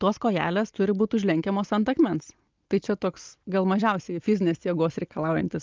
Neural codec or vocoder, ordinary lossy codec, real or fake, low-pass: none; Opus, 32 kbps; real; 7.2 kHz